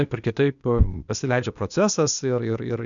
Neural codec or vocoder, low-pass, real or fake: codec, 16 kHz, 0.8 kbps, ZipCodec; 7.2 kHz; fake